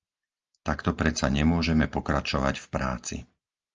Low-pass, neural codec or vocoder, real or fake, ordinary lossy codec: 7.2 kHz; none; real; Opus, 24 kbps